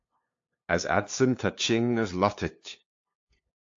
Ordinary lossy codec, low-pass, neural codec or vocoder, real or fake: AAC, 48 kbps; 7.2 kHz; codec, 16 kHz, 2 kbps, FunCodec, trained on LibriTTS, 25 frames a second; fake